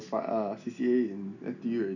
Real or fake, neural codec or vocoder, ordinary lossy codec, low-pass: real; none; none; 7.2 kHz